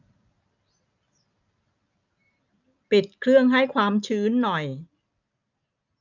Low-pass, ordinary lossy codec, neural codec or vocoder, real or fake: 7.2 kHz; none; none; real